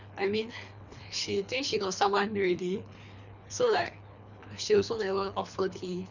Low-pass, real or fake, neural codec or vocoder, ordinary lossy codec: 7.2 kHz; fake; codec, 24 kHz, 3 kbps, HILCodec; none